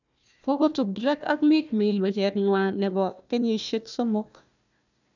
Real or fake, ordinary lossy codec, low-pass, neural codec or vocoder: fake; none; 7.2 kHz; codec, 16 kHz, 1 kbps, FunCodec, trained on Chinese and English, 50 frames a second